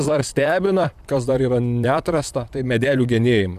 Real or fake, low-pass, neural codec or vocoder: fake; 14.4 kHz; vocoder, 44.1 kHz, 128 mel bands, Pupu-Vocoder